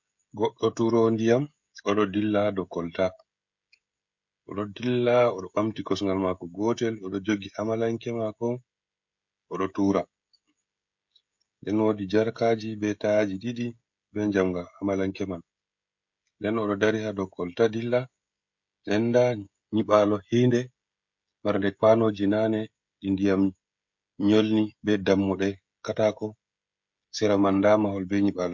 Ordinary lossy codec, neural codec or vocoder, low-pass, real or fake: MP3, 48 kbps; codec, 16 kHz, 16 kbps, FreqCodec, smaller model; 7.2 kHz; fake